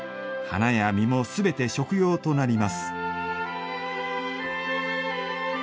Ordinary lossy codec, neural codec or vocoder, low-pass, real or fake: none; none; none; real